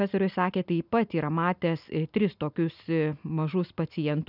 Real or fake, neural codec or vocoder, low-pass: real; none; 5.4 kHz